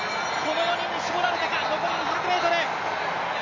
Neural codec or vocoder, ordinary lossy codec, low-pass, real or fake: none; none; 7.2 kHz; real